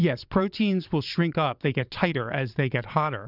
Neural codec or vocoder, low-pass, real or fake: vocoder, 22.05 kHz, 80 mel bands, Vocos; 5.4 kHz; fake